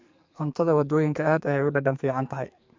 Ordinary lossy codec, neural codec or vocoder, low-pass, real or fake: MP3, 64 kbps; codec, 44.1 kHz, 2.6 kbps, SNAC; 7.2 kHz; fake